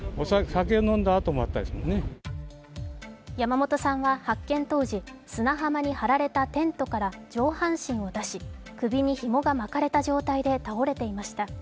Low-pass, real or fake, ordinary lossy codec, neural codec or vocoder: none; real; none; none